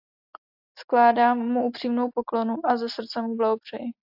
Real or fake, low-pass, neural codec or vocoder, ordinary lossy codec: real; 5.4 kHz; none; AAC, 48 kbps